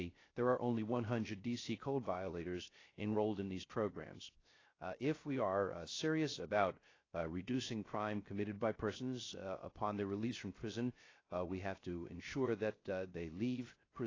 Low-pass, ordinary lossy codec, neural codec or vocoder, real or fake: 7.2 kHz; AAC, 32 kbps; codec, 16 kHz, 0.3 kbps, FocalCodec; fake